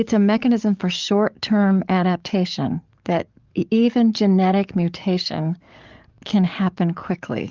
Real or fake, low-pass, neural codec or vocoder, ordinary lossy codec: fake; 7.2 kHz; codec, 44.1 kHz, 7.8 kbps, DAC; Opus, 24 kbps